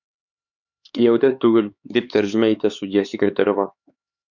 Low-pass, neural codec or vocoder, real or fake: 7.2 kHz; codec, 16 kHz, 4 kbps, X-Codec, HuBERT features, trained on LibriSpeech; fake